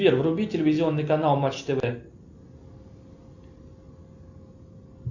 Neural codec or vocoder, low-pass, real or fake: none; 7.2 kHz; real